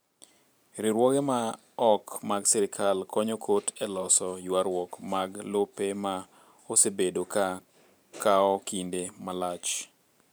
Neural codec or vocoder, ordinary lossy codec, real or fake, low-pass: vocoder, 44.1 kHz, 128 mel bands every 512 samples, BigVGAN v2; none; fake; none